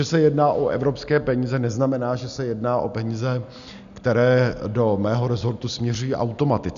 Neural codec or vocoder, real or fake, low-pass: none; real; 7.2 kHz